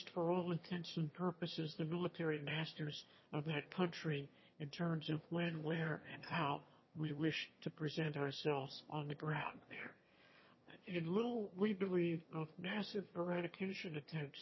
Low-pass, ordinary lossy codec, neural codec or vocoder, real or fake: 7.2 kHz; MP3, 24 kbps; autoencoder, 22.05 kHz, a latent of 192 numbers a frame, VITS, trained on one speaker; fake